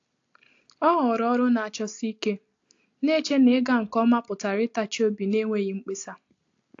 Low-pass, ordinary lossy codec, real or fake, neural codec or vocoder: 7.2 kHz; AAC, 48 kbps; real; none